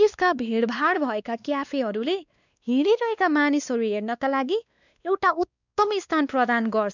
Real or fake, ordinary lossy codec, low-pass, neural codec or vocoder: fake; none; 7.2 kHz; codec, 16 kHz, 2 kbps, X-Codec, WavLM features, trained on Multilingual LibriSpeech